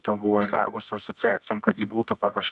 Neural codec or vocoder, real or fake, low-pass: codec, 24 kHz, 0.9 kbps, WavTokenizer, medium music audio release; fake; 10.8 kHz